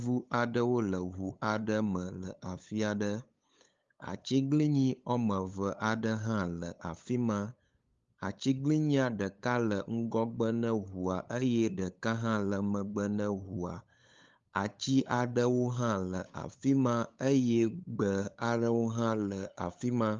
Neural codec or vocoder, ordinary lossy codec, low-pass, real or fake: codec, 16 kHz, 8 kbps, FunCodec, trained on LibriTTS, 25 frames a second; Opus, 32 kbps; 7.2 kHz; fake